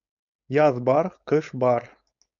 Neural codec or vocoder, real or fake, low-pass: codec, 16 kHz, 4.8 kbps, FACodec; fake; 7.2 kHz